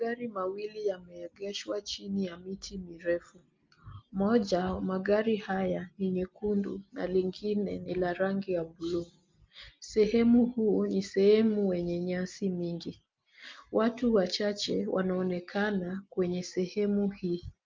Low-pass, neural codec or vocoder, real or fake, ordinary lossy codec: 7.2 kHz; none; real; Opus, 24 kbps